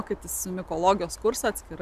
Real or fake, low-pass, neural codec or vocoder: real; 14.4 kHz; none